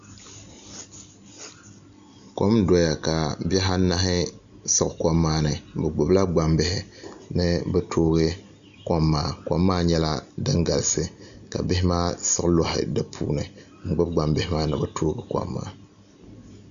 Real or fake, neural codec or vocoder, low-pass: real; none; 7.2 kHz